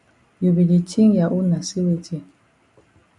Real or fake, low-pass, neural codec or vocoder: real; 10.8 kHz; none